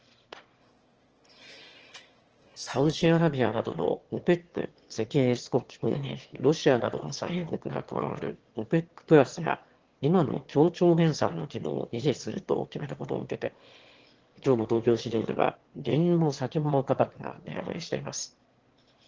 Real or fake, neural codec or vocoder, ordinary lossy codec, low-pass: fake; autoencoder, 22.05 kHz, a latent of 192 numbers a frame, VITS, trained on one speaker; Opus, 16 kbps; 7.2 kHz